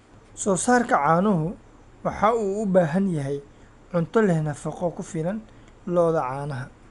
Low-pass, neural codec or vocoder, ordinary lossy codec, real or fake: 10.8 kHz; none; none; real